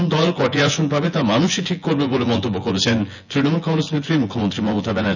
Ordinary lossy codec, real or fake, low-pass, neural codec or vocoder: none; fake; 7.2 kHz; vocoder, 24 kHz, 100 mel bands, Vocos